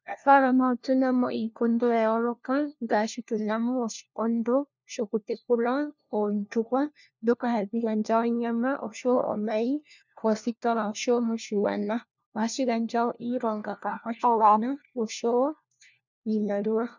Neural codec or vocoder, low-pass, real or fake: codec, 16 kHz, 1 kbps, FunCodec, trained on LibriTTS, 50 frames a second; 7.2 kHz; fake